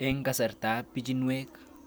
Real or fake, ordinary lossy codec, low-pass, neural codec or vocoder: real; none; none; none